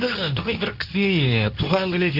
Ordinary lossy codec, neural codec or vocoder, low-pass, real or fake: none; codec, 24 kHz, 0.9 kbps, WavTokenizer, medium speech release version 2; 5.4 kHz; fake